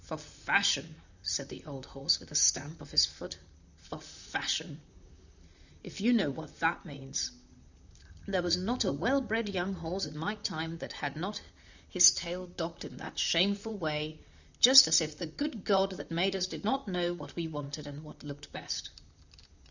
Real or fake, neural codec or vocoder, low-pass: fake; vocoder, 44.1 kHz, 128 mel bands, Pupu-Vocoder; 7.2 kHz